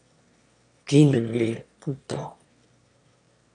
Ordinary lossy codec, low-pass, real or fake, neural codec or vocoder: MP3, 64 kbps; 9.9 kHz; fake; autoencoder, 22.05 kHz, a latent of 192 numbers a frame, VITS, trained on one speaker